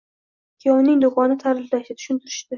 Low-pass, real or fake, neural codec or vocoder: 7.2 kHz; real; none